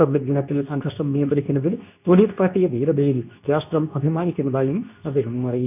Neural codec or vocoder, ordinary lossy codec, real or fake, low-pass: codec, 24 kHz, 0.9 kbps, WavTokenizer, medium speech release version 2; none; fake; 3.6 kHz